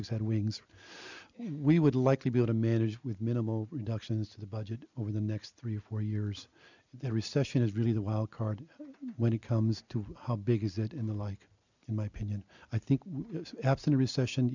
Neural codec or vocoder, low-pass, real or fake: none; 7.2 kHz; real